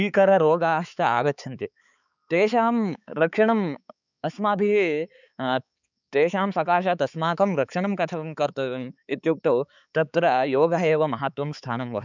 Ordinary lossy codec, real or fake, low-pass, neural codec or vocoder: none; fake; 7.2 kHz; codec, 16 kHz, 4 kbps, X-Codec, HuBERT features, trained on balanced general audio